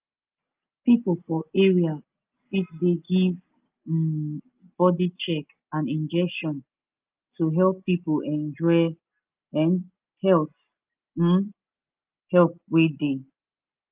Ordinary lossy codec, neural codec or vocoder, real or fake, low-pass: Opus, 24 kbps; none; real; 3.6 kHz